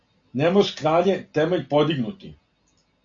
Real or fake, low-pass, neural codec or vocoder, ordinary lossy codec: real; 7.2 kHz; none; AAC, 48 kbps